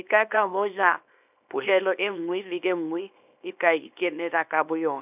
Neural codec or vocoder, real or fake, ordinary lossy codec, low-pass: codec, 24 kHz, 0.9 kbps, WavTokenizer, small release; fake; none; 3.6 kHz